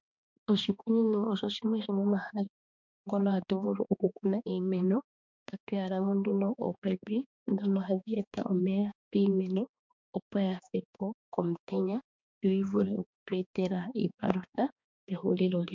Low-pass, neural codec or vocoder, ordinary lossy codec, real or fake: 7.2 kHz; codec, 16 kHz, 2 kbps, X-Codec, HuBERT features, trained on balanced general audio; MP3, 64 kbps; fake